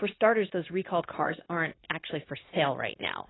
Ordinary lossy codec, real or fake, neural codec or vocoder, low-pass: AAC, 16 kbps; fake; codec, 16 kHz, 0.9 kbps, LongCat-Audio-Codec; 7.2 kHz